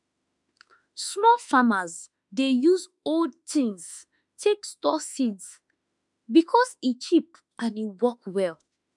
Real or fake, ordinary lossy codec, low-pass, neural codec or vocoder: fake; none; 10.8 kHz; autoencoder, 48 kHz, 32 numbers a frame, DAC-VAE, trained on Japanese speech